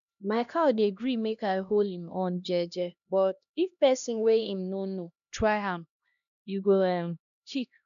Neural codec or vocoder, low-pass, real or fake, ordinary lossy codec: codec, 16 kHz, 1 kbps, X-Codec, HuBERT features, trained on LibriSpeech; 7.2 kHz; fake; none